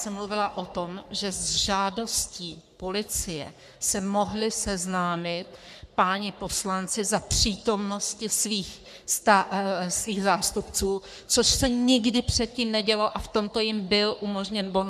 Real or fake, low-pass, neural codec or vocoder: fake; 14.4 kHz; codec, 44.1 kHz, 3.4 kbps, Pupu-Codec